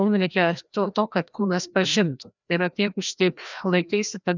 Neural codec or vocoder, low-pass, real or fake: codec, 16 kHz, 1 kbps, FreqCodec, larger model; 7.2 kHz; fake